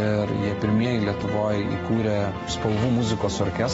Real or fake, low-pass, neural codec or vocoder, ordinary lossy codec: real; 19.8 kHz; none; AAC, 24 kbps